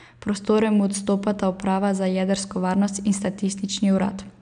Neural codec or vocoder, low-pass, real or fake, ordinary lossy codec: none; 9.9 kHz; real; none